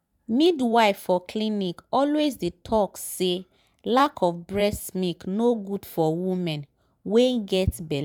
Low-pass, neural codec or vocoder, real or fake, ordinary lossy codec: 19.8 kHz; vocoder, 44.1 kHz, 128 mel bands every 512 samples, BigVGAN v2; fake; none